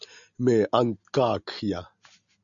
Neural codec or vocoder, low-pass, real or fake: none; 7.2 kHz; real